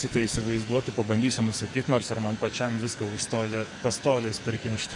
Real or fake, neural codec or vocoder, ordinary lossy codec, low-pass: fake; codec, 44.1 kHz, 2.6 kbps, SNAC; MP3, 96 kbps; 10.8 kHz